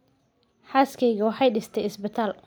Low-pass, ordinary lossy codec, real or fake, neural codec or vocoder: none; none; real; none